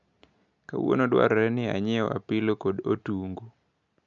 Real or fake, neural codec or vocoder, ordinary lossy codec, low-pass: real; none; MP3, 96 kbps; 7.2 kHz